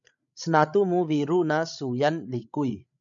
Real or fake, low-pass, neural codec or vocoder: fake; 7.2 kHz; codec, 16 kHz, 16 kbps, FreqCodec, larger model